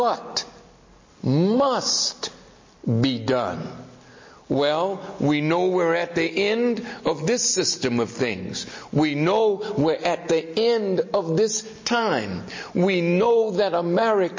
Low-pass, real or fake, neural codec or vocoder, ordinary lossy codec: 7.2 kHz; real; none; MP3, 32 kbps